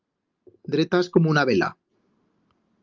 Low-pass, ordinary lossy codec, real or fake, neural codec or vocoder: 7.2 kHz; Opus, 24 kbps; real; none